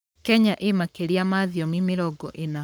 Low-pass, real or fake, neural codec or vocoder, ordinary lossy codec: none; fake; codec, 44.1 kHz, 7.8 kbps, Pupu-Codec; none